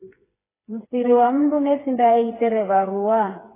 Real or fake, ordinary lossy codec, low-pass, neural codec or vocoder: fake; AAC, 16 kbps; 3.6 kHz; codec, 16 kHz, 4 kbps, FreqCodec, smaller model